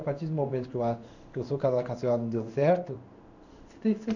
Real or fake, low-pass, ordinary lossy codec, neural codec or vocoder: fake; 7.2 kHz; none; codec, 16 kHz in and 24 kHz out, 1 kbps, XY-Tokenizer